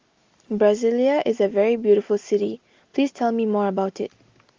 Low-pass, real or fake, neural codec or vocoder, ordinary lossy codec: 7.2 kHz; real; none; Opus, 32 kbps